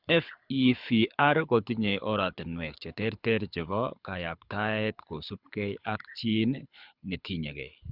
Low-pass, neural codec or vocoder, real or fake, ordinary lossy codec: 5.4 kHz; codec, 24 kHz, 6 kbps, HILCodec; fake; Opus, 64 kbps